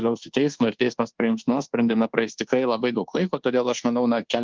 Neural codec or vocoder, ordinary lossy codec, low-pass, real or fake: codec, 24 kHz, 1.2 kbps, DualCodec; Opus, 16 kbps; 7.2 kHz; fake